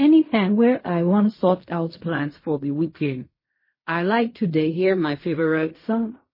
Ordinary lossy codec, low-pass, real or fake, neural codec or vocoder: MP3, 24 kbps; 5.4 kHz; fake; codec, 16 kHz in and 24 kHz out, 0.4 kbps, LongCat-Audio-Codec, fine tuned four codebook decoder